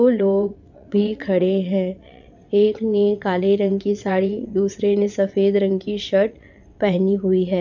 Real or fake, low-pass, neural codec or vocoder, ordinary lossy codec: fake; 7.2 kHz; vocoder, 22.05 kHz, 80 mel bands, WaveNeXt; none